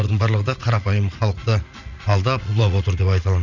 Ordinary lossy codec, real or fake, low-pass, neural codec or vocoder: none; fake; 7.2 kHz; vocoder, 44.1 kHz, 80 mel bands, Vocos